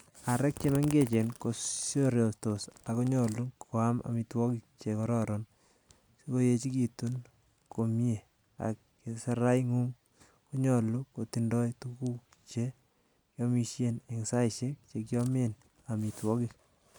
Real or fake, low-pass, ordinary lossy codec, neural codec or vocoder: real; none; none; none